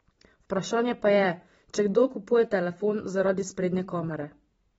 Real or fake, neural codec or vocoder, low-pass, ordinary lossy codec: fake; vocoder, 44.1 kHz, 128 mel bands every 512 samples, BigVGAN v2; 19.8 kHz; AAC, 24 kbps